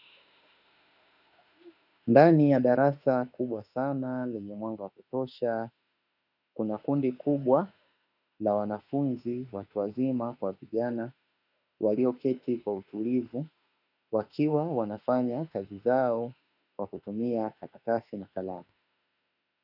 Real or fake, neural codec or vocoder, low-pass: fake; autoencoder, 48 kHz, 32 numbers a frame, DAC-VAE, trained on Japanese speech; 5.4 kHz